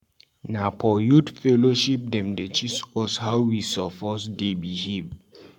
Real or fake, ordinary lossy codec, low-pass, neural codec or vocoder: fake; none; 19.8 kHz; codec, 44.1 kHz, 7.8 kbps, Pupu-Codec